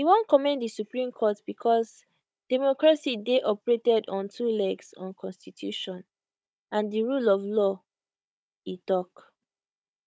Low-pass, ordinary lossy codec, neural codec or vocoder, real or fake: none; none; codec, 16 kHz, 16 kbps, FunCodec, trained on Chinese and English, 50 frames a second; fake